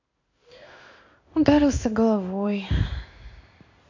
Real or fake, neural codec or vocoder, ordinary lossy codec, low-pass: fake; codec, 16 kHz in and 24 kHz out, 1 kbps, XY-Tokenizer; AAC, 48 kbps; 7.2 kHz